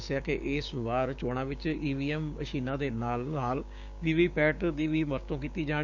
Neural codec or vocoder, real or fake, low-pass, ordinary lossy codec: codec, 16 kHz, 6 kbps, DAC; fake; 7.2 kHz; none